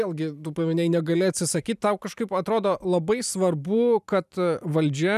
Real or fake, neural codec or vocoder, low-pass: fake; vocoder, 44.1 kHz, 128 mel bands every 512 samples, BigVGAN v2; 14.4 kHz